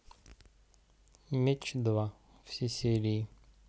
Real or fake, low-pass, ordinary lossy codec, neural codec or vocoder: real; none; none; none